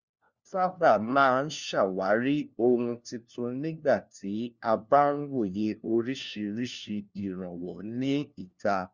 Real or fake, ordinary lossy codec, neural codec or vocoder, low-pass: fake; Opus, 64 kbps; codec, 16 kHz, 1 kbps, FunCodec, trained on LibriTTS, 50 frames a second; 7.2 kHz